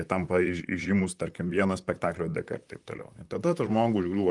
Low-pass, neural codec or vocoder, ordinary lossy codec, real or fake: 10.8 kHz; vocoder, 44.1 kHz, 128 mel bands, Pupu-Vocoder; Opus, 32 kbps; fake